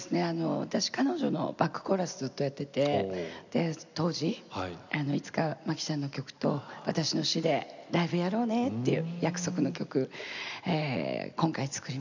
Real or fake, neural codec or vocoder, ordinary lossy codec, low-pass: real; none; none; 7.2 kHz